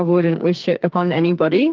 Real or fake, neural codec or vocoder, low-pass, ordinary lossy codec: fake; codec, 44.1 kHz, 2.6 kbps, SNAC; 7.2 kHz; Opus, 24 kbps